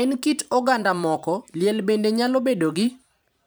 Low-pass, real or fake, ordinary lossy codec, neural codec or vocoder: none; fake; none; vocoder, 44.1 kHz, 128 mel bands every 512 samples, BigVGAN v2